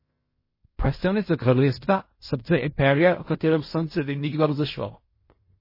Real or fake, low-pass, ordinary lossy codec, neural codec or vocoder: fake; 5.4 kHz; MP3, 24 kbps; codec, 16 kHz in and 24 kHz out, 0.4 kbps, LongCat-Audio-Codec, fine tuned four codebook decoder